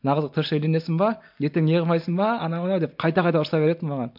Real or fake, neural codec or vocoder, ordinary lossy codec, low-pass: real; none; MP3, 48 kbps; 5.4 kHz